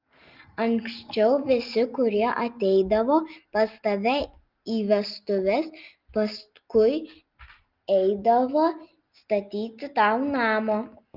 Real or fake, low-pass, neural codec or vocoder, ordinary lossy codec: real; 5.4 kHz; none; Opus, 32 kbps